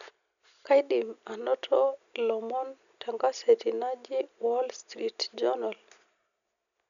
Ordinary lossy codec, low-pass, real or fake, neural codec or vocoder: none; 7.2 kHz; real; none